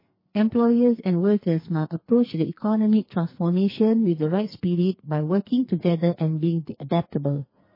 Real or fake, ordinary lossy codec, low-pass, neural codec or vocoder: fake; MP3, 24 kbps; 5.4 kHz; codec, 44.1 kHz, 2.6 kbps, SNAC